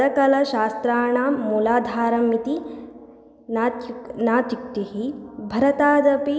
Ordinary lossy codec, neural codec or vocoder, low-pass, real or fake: none; none; none; real